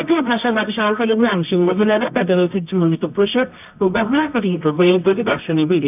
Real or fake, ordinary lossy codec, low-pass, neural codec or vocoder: fake; none; 3.6 kHz; codec, 24 kHz, 0.9 kbps, WavTokenizer, medium music audio release